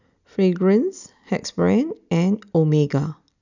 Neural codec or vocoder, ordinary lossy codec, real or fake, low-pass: none; none; real; 7.2 kHz